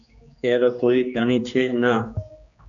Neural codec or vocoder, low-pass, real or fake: codec, 16 kHz, 2 kbps, X-Codec, HuBERT features, trained on general audio; 7.2 kHz; fake